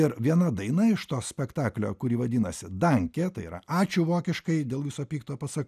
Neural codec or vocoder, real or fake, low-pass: none; real; 14.4 kHz